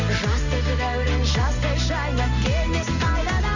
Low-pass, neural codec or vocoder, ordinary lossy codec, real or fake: 7.2 kHz; none; none; real